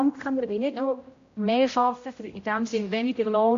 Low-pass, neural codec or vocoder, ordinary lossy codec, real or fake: 7.2 kHz; codec, 16 kHz, 0.5 kbps, X-Codec, HuBERT features, trained on general audio; AAC, 48 kbps; fake